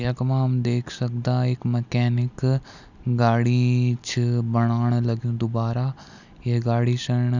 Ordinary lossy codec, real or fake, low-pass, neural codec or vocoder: none; real; 7.2 kHz; none